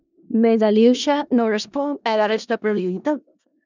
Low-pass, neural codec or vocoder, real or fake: 7.2 kHz; codec, 16 kHz in and 24 kHz out, 0.4 kbps, LongCat-Audio-Codec, four codebook decoder; fake